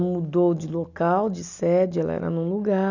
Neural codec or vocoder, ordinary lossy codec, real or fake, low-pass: none; none; real; 7.2 kHz